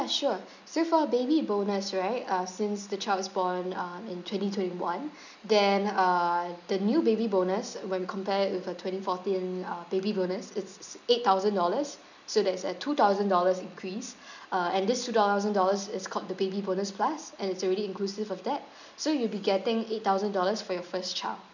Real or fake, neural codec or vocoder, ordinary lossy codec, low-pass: real; none; none; 7.2 kHz